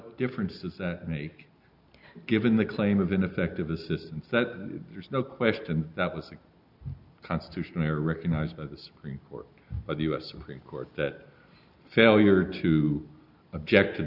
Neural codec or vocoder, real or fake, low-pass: none; real; 5.4 kHz